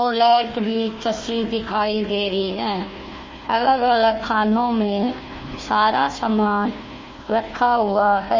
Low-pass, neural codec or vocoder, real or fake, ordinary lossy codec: 7.2 kHz; codec, 16 kHz, 1 kbps, FunCodec, trained on Chinese and English, 50 frames a second; fake; MP3, 32 kbps